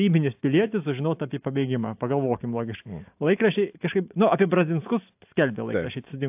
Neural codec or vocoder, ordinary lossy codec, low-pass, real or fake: none; AAC, 32 kbps; 3.6 kHz; real